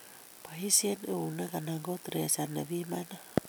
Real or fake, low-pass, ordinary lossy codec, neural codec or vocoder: real; none; none; none